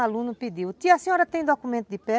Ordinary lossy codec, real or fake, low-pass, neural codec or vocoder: none; real; none; none